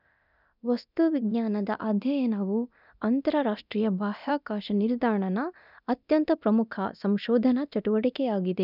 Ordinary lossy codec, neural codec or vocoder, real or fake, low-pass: none; codec, 24 kHz, 0.9 kbps, DualCodec; fake; 5.4 kHz